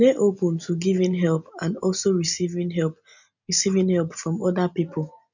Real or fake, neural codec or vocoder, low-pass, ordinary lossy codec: real; none; 7.2 kHz; none